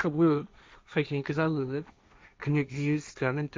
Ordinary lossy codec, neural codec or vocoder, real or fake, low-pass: none; codec, 16 kHz, 1.1 kbps, Voila-Tokenizer; fake; none